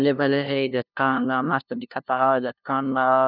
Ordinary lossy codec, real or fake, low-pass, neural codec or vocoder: none; fake; 5.4 kHz; codec, 16 kHz, 0.5 kbps, FunCodec, trained on LibriTTS, 25 frames a second